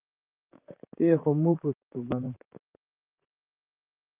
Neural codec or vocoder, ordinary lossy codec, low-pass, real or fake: vocoder, 44.1 kHz, 128 mel bands, Pupu-Vocoder; Opus, 24 kbps; 3.6 kHz; fake